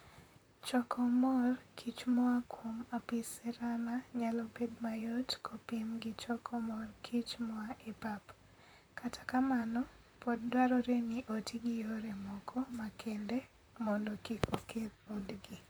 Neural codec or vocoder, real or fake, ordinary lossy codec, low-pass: vocoder, 44.1 kHz, 128 mel bands, Pupu-Vocoder; fake; none; none